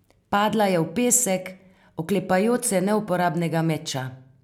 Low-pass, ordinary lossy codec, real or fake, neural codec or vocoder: 19.8 kHz; none; real; none